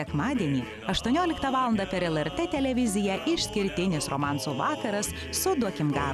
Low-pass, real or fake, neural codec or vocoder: 14.4 kHz; real; none